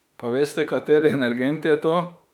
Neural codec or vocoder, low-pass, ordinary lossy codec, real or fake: autoencoder, 48 kHz, 32 numbers a frame, DAC-VAE, trained on Japanese speech; 19.8 kHz; none; fake